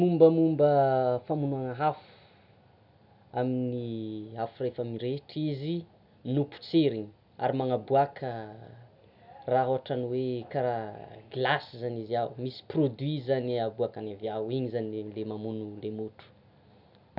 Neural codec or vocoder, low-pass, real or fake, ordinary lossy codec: none; 5.4 kHz; real; none